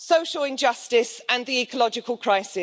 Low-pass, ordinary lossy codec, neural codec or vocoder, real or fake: none; none; none; real